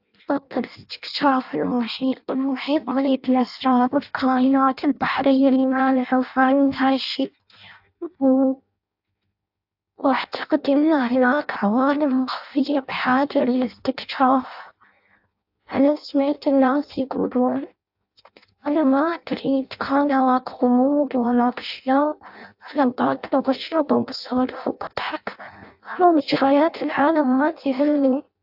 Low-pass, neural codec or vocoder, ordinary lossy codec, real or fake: 5.4 kHz; codec, 16 kHz in and 24 kHz out, 0.6 kbps, FireRedTTS-2 codec; AAC, 48 kbps; fake